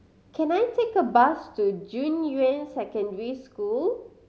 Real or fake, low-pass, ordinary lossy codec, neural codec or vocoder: real; none; none; none